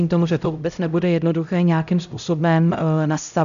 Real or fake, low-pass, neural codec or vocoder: fake; 7.2 kHz; codec, 16 kHz, 0.5 kbps, X-Codec, HuBERT features, trained on LibriSpeech